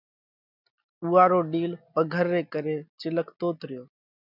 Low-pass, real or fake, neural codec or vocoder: 5.4 kHz; real; none